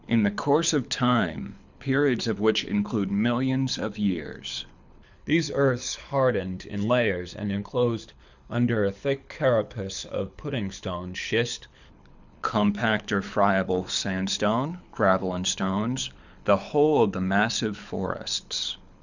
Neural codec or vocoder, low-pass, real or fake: codec, 24 kHz, 6 kbps, HILCodec; 7.2 kHz; fake